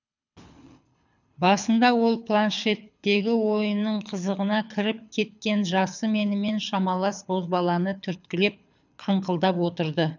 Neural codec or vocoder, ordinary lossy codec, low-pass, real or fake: codec, 24 kHz, 6 kbps, HILCodec; none; 7.2 kHz; fake